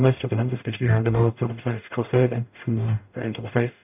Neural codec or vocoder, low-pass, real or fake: codec, 44.1 kHz, 0.9 kbps, DAC; 3.6 kHz; fake